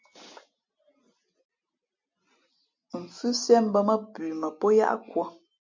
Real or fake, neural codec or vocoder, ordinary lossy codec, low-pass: real; none; MP3, 48 kbps; 7.2 kHz